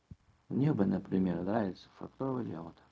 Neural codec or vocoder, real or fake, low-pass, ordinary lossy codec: codec, 16 kHz, 0.4 kbps, LongCat-Audio-Codec; fake; none; none